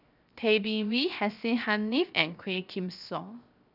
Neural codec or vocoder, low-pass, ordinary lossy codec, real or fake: codec, 16 kHz, 0.7 kbps, FocalCodec; 5.4 kHz; none; fake